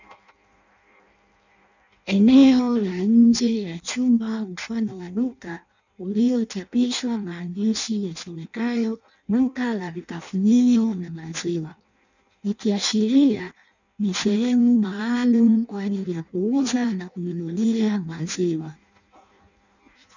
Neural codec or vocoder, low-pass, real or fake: codec, 16 kHz in and 24 kHz out, 0.6 kbps, FireRedTTS-2 codec; 7.2 kHz; fake